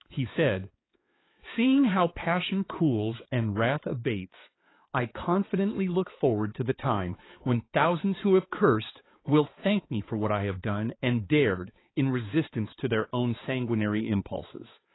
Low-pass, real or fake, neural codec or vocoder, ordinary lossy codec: 7.2 kHz; fake; codec, 16 kHz, 4 kbps, X-Codec, HuBERT features, trained on balanced general audio; AAC, 16 kbps